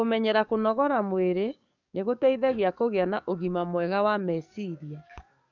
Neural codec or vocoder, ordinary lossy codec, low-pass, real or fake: codec, 44.1 kHz, 7.8 kbps, DAC; none; 7.2 kHz; fake